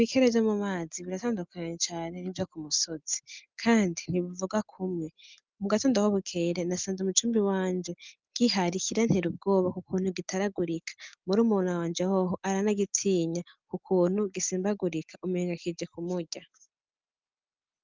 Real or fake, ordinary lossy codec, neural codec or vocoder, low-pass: real; Opus, 24 kbps; none; 7.2 kHz